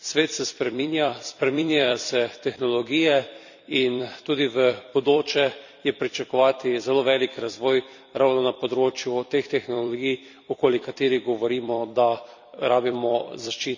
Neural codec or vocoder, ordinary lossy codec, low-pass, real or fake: vocoder, 44.1 kHz, 128 mel bands every 256 samples, BigVGAN v2; none; 7.2 kHz; fake